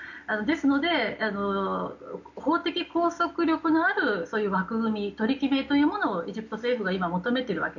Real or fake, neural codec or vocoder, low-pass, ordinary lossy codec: real; none; 7.2 kHz; none